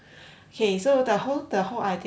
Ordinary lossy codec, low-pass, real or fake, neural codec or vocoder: none; none; real; none